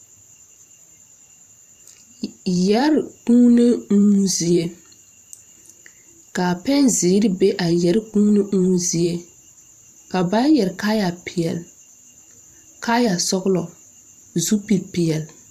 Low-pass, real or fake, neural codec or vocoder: 14.4 kHz; fake; vocoder, 44.1 kHz, 128 mel bands every 512 samples, BigVGAN v2